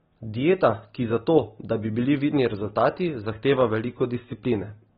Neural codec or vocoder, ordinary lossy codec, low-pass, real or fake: none; AAC, 16 kbps; 19.8 kHz; real